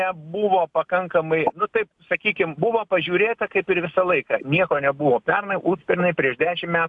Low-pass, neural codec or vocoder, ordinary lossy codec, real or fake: 10.8 kHz; none; AAC, 64 kbps; real